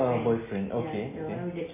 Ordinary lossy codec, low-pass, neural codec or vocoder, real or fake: MP3, 16 kbps; 3.6 kHz; none; real